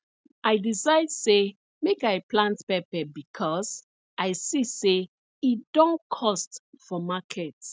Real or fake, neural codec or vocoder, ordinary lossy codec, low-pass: real; none; none; none